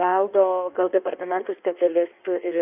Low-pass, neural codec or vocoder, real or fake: 3.6 kHz; codec, 16 kHz in and 24 kHz out, 1.1 kbps, FireRedTTS-2 codec; fake